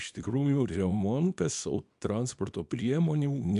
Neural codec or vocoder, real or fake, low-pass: codec, 24 kHz, 0.9 kbps, WavTokenizer, small release; fake; 10.8 kHz